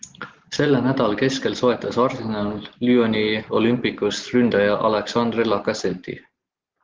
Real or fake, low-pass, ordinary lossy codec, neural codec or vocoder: real; 7.2 kHz; Opus, 16 kbps; none